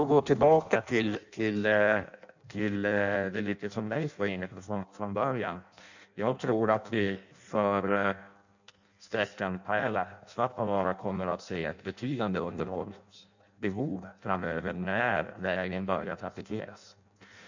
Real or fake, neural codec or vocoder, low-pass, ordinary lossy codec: fake; codec, 16 kHz in and 24 kHz out, 0.6 kbps, FireRedTTS-2 codec; 7.2 kHz; none